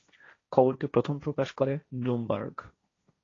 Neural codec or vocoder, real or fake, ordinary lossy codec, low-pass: codec, 16 kHz, 1.1 kbps, Voila-Tokenizer; fake; AAC, 32 kbps; 7.2 kHz